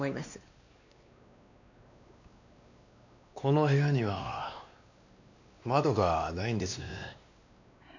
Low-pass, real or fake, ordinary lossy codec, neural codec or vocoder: 7.2 kHz; fake; none; codec, 16 kHz, 2 kbps, X-Codec, WavLM features, trained on Multilingual LibriSpeech